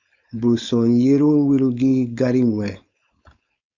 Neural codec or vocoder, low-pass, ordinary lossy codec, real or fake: codec, 16 kHz, 4.8 kbps, FACodec; 7.2 kHz; Opus, 64 kbps; fake